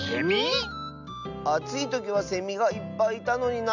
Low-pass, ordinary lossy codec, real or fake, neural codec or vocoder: 7.2 kHz; none; real; none